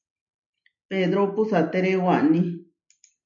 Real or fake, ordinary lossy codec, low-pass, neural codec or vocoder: real; MP3, 96 kbps; 7.2 kHz; none